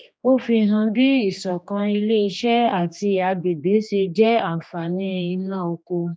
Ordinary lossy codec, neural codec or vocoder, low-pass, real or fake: none; codec, 16 kHz, 2 kbps, X-Codec, HuBERT features, trained on general audio; none; fake